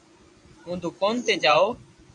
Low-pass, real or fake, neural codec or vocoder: 10.8 kHz; fake; vocoder, 24 kHz, 100 mel bands, Vocos